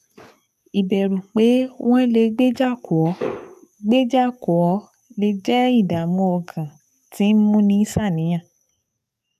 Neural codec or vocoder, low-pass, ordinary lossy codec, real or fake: codec, 44.1 kHz, 7.8 kbps, DAC; 14.4 kHz; none; fake